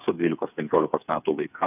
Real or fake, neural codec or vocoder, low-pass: fake; codec, 16 kHz, 8 kbps, FreqCodec, smaller model; 3.6 kHz